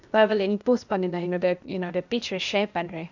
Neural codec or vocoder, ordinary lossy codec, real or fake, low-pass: codec, 16 kHz, 0.8 kbps, ZipCodec; none; fake; 7.2 kHz